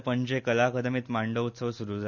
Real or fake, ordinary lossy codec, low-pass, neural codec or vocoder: real; none; 7.2 kHz; none